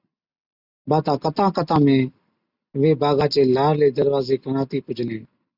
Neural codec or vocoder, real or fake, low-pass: none; real; 5.4 kHz